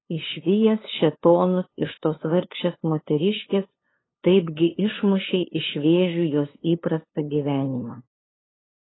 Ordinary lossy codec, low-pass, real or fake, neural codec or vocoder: AAC, 16 kbps; 7.2 kHz; fake; codec, 16 kHz, 2 kbps, FunCodec, trained on LibriTTS, 25 frames a second